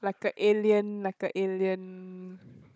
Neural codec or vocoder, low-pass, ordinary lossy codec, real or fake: codec, 16 kHz, 16 kbps, FunCodec, trained on Chinese and English, 50 frames a second; none; none; fake